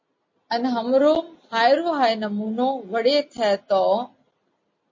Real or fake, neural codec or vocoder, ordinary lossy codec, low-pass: real; none; MP3, 32 kbps; 7.2 kHz